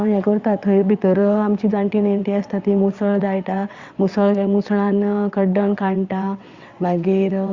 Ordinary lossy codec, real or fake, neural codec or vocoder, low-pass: Opus, 64 kbps; fake; vocoder, 22.05 kHz, 80 mel bands, WaveNeXt; 7.2 kHz